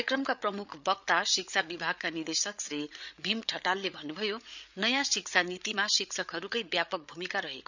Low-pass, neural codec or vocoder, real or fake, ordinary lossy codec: 7.2 kHz; codec, 16 kHz, 8 kbps, FreqCodec, larger model; fake; none